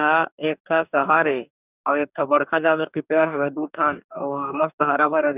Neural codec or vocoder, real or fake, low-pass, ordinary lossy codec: codec, 44.1 kHz, 2.6 kbps, DAC; fake; 3.6 kHz; none